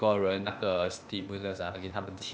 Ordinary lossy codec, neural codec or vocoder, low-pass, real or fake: none; codec, 16 kHz, 0.8 kbps, ZipCodec; none; fake